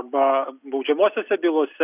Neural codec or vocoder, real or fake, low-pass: none; real; 3.6 kHz